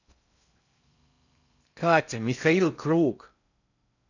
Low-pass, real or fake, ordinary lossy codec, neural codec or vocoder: 7.2 kHz; fake; none; codec, 16 kHz in and 24 kHz out, 0.6 kbps, FocalCodec, streaming, 2048 codes